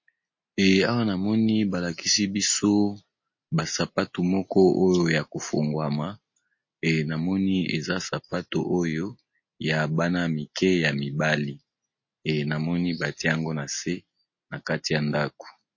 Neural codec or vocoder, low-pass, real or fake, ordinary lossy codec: none; 7.2 kHz; real; MP3, 32 kbps